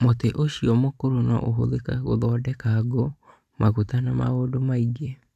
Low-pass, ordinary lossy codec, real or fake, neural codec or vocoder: 14.4 kHz; none; fake; vocoder, 44.1 kHz, 128 mel bands every 512 samples, BigVGAN v2